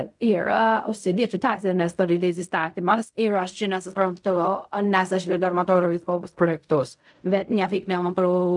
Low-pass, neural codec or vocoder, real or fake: 10.8 kHz; codec, 16 kHz in and 24 kHz out, 0.4 kbps, LongCat-Audio-Codec, fine tuned four codebook decoder; fake